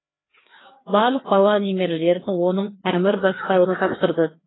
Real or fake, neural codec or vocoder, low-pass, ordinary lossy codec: fake; codec, 16 kHz, 2 kbps, FreqCodec, larger model; 7.2 kHz; AAC, 16 kbps